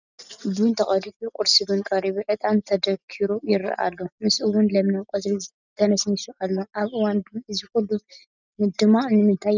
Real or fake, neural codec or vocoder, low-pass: real; none; 7.2 kHz